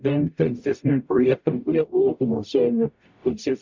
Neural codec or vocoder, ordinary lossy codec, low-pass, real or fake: codec, 44.1 kHz, 0.9 kbps, DAC; MP3, 64 kbps; 7.2 kHz; fake